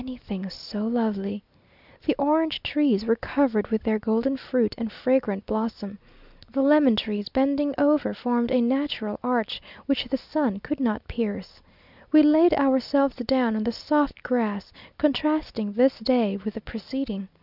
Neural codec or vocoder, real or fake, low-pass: none; real; 5.4 kHz